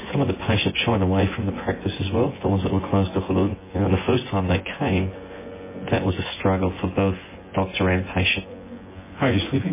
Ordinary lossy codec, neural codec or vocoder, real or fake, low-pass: MP3, 16 kbps; vocoder, 24 kHz, 100 mel bands, Vocos; fake; 3.6 kHz